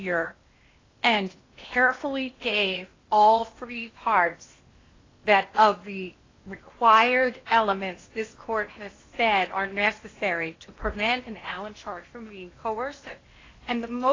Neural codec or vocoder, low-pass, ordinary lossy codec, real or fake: codec, 16 kHz in and 24 kHz out, 0.8 kbps, FocalCodec, streaming, 65536 codes; 7.2 kHz; AAC, 32 kbps; fake